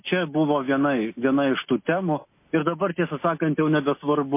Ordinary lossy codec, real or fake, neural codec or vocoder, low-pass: MP3, 24 kbps; real; none; 3.6 kHz